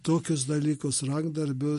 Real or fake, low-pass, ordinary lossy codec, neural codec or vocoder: real; 14.4 kHz; MP3, 48 kbps; none